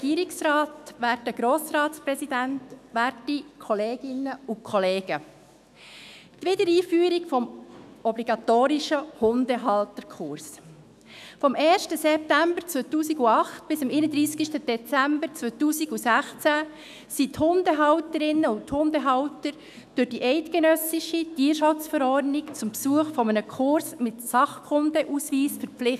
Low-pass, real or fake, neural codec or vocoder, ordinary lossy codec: 14.4 kHz; fake; autoencoder, 48 kHz, 128 numbers a frame, DAC-VAE, trained on Japanese speech; none